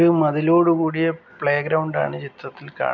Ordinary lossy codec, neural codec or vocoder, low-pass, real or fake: none; none; 7.2 kHz; real